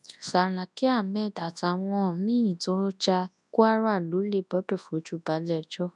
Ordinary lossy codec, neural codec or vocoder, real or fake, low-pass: none; codec, 24 kHz, 0.9 kbps, WavTokenizer, large speech release; fake; 10.8 kHz